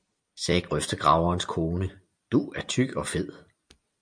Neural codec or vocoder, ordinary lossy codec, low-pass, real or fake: none; MP3, 64 kbps; 9.9 kHz; real